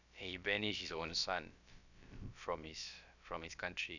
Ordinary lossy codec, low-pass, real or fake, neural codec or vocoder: none; 7.2 kHz; fake; codec, 16 kHz, about 1 kbps, DyCAST, with the encoder's durations